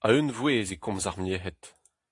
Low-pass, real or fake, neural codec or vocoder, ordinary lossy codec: 10.8 kHz; real; none; AAC, 48 kbps